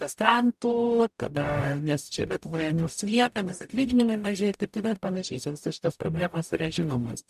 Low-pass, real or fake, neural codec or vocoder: 14.4 kHz; fake; codec, 44.1 kHz, 0.9 kbps, DAC